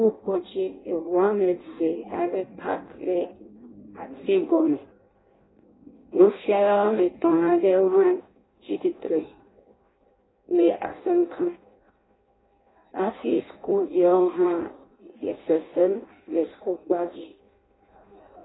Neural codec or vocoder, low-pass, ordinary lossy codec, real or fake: codec, 16 kHz in and 24 kHz out, 0.6 kbps, FireRedTTS-2 codec; 7.2 kHz; AAC, 16 kbps; fake